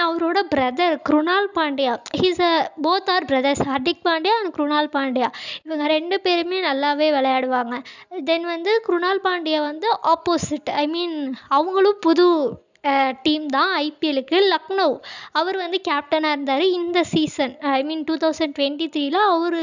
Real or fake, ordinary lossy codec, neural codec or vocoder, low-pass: real; none; none; 7.2 kHz